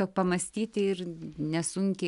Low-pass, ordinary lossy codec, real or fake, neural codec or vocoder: 10.8 kHz; MP3, 96 kbps; real; none